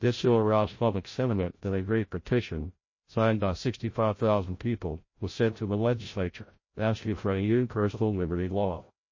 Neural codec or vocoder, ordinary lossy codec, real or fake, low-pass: codec, 16 kHz, 0.5 kbps, FreqCodec, larger model; MP3, 32 kbps; fake; 7.2 kHz